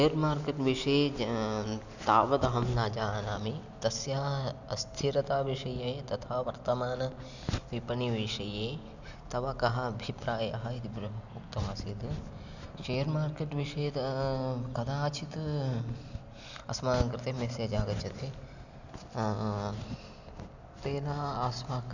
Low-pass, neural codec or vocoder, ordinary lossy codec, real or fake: 7.2 kHz; none; none; real